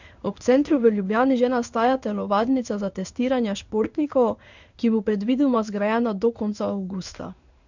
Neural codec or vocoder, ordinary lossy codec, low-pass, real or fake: codec, 16 kHz in and 24 kHz out, 1 kbps, XY-Tokenizer; none; 7.2 kHz; fake